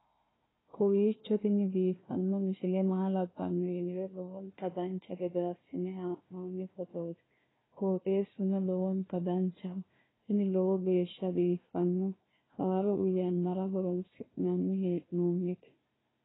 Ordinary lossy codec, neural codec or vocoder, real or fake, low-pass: AAC, 16 kbps; codec, 16 kHz, 1 kbps, FunCodec, trained on Chinese and English, 50 frames a second; fake; 7.2 kHz